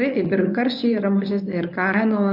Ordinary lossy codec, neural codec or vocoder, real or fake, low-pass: AAC, 48 kbps; codec, 24 kHz, 0.9 kbps, WavTokenizer, medium speech release version 1; fake; 5.4 kHz